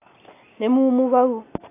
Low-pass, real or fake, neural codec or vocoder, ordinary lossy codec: 3.6 kHz; real; none; AAC, 24 kbps